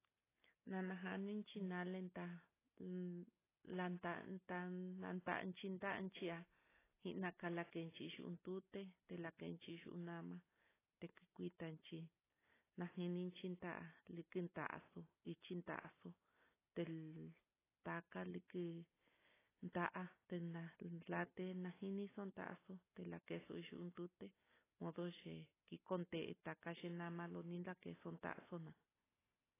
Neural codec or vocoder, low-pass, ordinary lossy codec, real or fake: none; 3.6 kHz; AAC, 16 kbps; real